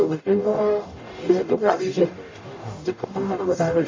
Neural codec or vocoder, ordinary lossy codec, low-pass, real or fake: codec, 44.1 kHz, 0.9 kbps, DAC; MP3, 32 kbps; 7.2 kHz; fake